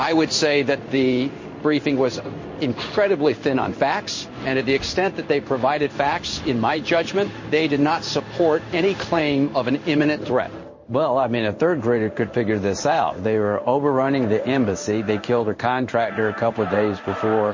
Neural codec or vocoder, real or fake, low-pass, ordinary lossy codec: codec, 16 kHz in and 24 kHz out, 1 kbps, XY-Tokenizer; fake; 7.2 kHz; MP3, 32 kbps